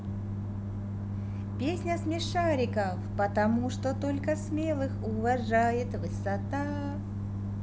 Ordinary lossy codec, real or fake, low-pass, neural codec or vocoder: none; real; none; none